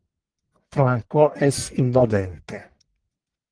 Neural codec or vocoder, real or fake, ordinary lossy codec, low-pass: codec, 44.1 kHz, 1.7 kbps, Pupu-Codec; fake; Opus, 24 kbps; 9.9 kHz